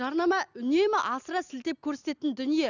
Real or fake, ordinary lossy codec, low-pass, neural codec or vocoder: real; none; 7.2 kHz; none